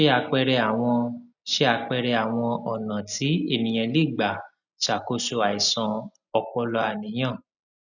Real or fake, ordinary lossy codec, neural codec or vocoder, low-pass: real; none; none; 7.2 kHz